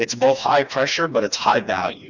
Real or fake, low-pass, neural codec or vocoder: fake; 7.2 kHz; codec, 16 kHz, 2 kbps, FreqCodec, smaller model